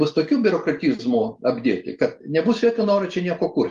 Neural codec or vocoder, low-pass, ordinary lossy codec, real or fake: none; 9.9 kHz; Opus, 24 kbps; real